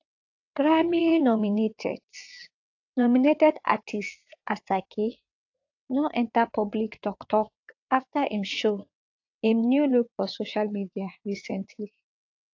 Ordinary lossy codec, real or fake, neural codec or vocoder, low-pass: AAC, 48 kbps; fake; vocoder, 22.05 kHz, 80 mel bands, WaveNeXt; 7.2 kHz